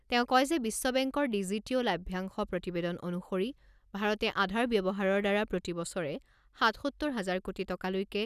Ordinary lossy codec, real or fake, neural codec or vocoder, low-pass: none; real; none; 14.4 kHz